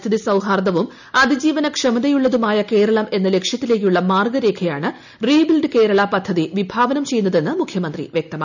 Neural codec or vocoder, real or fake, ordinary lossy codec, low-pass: none; real; none; 7.2 kHz